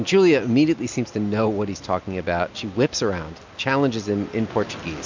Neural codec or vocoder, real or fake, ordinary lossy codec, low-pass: none; real; MP3, 64 kbps; 7.2 kHz